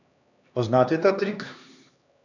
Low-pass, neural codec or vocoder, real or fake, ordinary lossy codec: 7.2 kHz; codec, 16 kHz, 2 kbps, X-Codec, HuBERT features, trained on LibriSpeech; fake; none